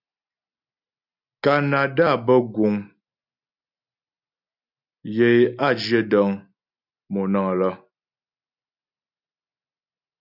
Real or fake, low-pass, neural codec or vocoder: real; 5.4 kHz; none